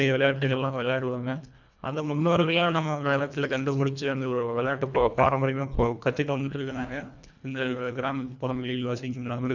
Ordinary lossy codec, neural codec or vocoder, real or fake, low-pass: none; codec, 24 kHz, 1.5 kbps, HILCodec; fake; 7.2 kHz